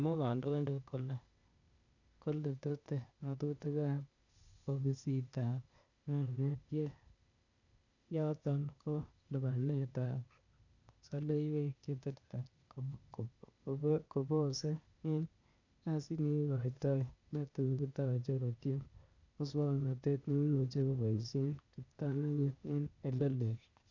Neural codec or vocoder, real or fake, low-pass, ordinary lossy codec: codec, 16 kHz, 0.8 kbps, ZipCodec; fake; 7.2 kHz; none